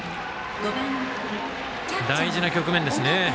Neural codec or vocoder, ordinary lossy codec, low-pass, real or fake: none; none; none; real